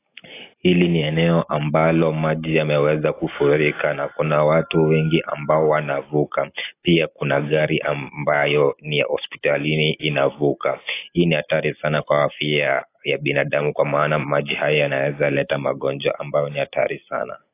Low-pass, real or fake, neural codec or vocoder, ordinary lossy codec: 3.6 kHz; real; none; AAC, 24 kbps